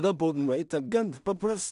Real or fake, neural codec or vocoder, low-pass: fake; codec, 16 kHz in and 24 kHz out, 0.4 kbps, LongCat-Audio-Codec, two codebook decoder; 10.8 kHz